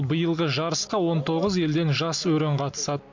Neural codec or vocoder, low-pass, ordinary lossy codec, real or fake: none; 7.2 kHz; MP3, 64 kbps; real